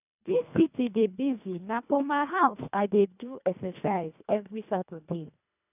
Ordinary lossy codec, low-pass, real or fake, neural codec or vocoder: none; 3.6 kHz; fake; codec, 24 kHz, 1.5 kbps, HILCodec